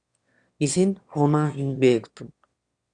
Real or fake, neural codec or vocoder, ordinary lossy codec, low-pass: fake; autoencoder, 22.05 kHz, a latent of 192 numbers a frame, VITS, trained on one speaker; Opus, 64 kbps; 9.9 kHz